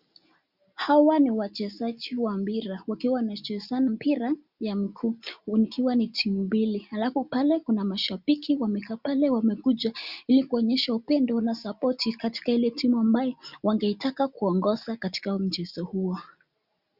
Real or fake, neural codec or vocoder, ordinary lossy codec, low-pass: real; none; Opus, 64 kbps; 5.4 kHz